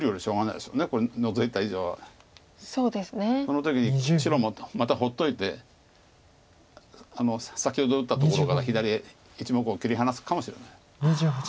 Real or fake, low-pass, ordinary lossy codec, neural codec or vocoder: real; none; none; none